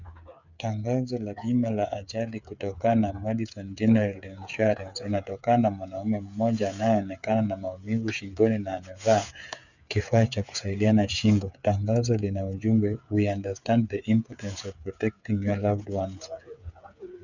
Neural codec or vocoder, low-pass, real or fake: codec, 16 kHz, 8 kbps, FreqCodec, smaller model; 7.2 kHz; fake